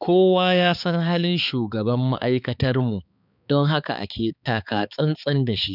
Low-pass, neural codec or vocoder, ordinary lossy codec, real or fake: 5.4 kHz; codec, 16 kHz, 4 kbps, X-Codec, HuBERT features, trained on balanced general audio; none; fake